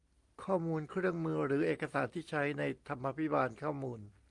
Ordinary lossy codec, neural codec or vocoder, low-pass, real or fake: Opus, 32 kbps; none; 10.8 kHz; real